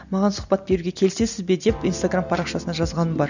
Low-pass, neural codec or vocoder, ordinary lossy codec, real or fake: 7.2 kHz; none; none; real